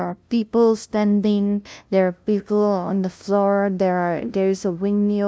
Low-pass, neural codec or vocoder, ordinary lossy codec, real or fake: none; codec, 16 kHz, 0.5 kbps, FunCodec, trained on LibriTTS, 25 frames a second; none; fake